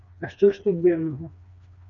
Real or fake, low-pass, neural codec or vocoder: fake; 7.2 kHz; codec, 16 kHz, 2 kbps, FreqCodec, smaller model